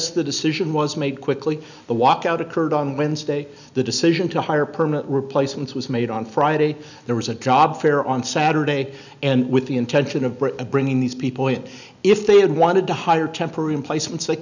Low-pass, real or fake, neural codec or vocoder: 7.2 kHz; real; none